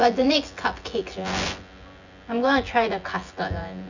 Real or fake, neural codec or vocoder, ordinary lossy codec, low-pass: fake; vocoder, 24 kHz, 100 mel bands, Vocos; none; 7.2 kHz